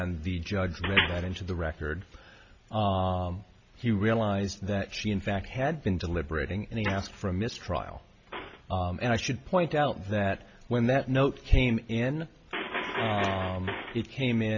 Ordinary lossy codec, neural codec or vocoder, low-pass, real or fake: MP3, 48 kbps; none; 7.2 kHz; real